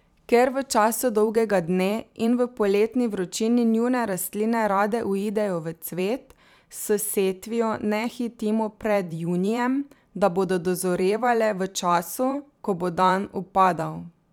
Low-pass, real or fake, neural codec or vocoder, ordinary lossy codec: 19.8 kHz; fake; vocoder, 44.1 kHz, 128 mel bands every 512 samples, BigVGAN v2; none